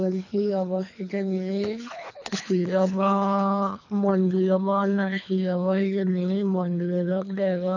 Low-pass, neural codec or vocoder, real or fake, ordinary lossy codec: 7.2 kHz; codec, 24 kHz, 3 kbps, HILCodec; fake; none